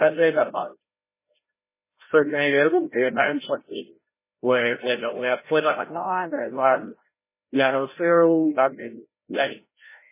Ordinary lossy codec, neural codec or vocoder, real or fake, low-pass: MP3, 16 kbps; codec, 16 kHz, 0.5 kbps, FreqCodec, larger model; fake; 3.6 kHz